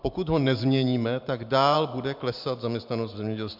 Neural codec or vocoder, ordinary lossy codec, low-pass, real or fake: vocoder, 44.1 kHz, 128 mel bands every 512 samples, BigVGAN v2; MP3, 48 kbps; 5.4 kHz; fake